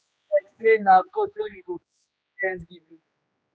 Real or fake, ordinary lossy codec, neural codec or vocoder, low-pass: fake; none; codec, 16 kHz, 2 kbps, X-Codec, HuBERT features, trained on general audio; none